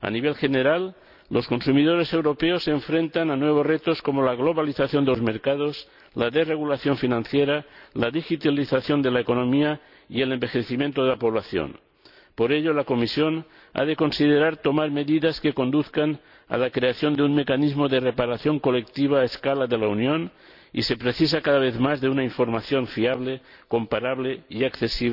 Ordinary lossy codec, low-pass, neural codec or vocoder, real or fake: none; 5.4 kHz; none; real